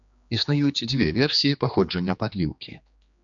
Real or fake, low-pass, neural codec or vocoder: fake; 7.2 kHz; codec, 16 kHz, 2 kbps, X-Codec, HuBERT features, trained on general audio